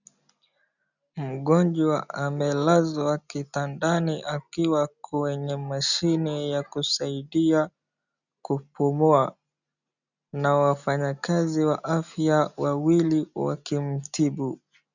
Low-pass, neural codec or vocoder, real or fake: 7.2 kHz; none; real